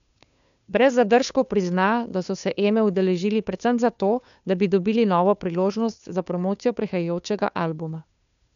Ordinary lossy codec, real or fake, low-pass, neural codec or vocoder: none; fake; 7.2 kHz; codec, 16 kHz, 2 kbps, FunCodec, trained on Chinese and English, 25 frames a second